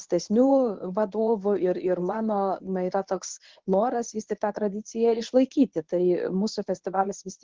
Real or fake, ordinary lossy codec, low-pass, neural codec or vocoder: fake; Opus, 16 kbps; 7.2 kHz; codec, 24 kHz, 0.9 kbps, WavTokenizer, medium speech release version 1